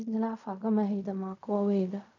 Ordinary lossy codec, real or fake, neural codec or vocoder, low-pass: none; fake; codec, 16 kHz in and 24 kHz out, 0.4 kbps, LongCat-Audio-Codec, fine tuned four codebook decoder; 7.2 kHz